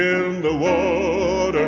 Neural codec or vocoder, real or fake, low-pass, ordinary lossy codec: none; real; 7.2 kHz; MP3, 64 kbps